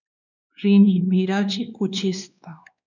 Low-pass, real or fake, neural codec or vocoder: 7.2 kHz; fake; codec, 16 kHz, 2 kbps, X-Codec, WavLM features, trained on Multilingual LibriSpeech